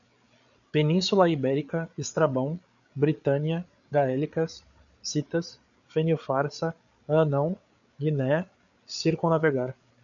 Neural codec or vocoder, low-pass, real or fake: codec, 16 kHz, 8 kbps, FreqCodec, larger model; 7.2 kHz; fake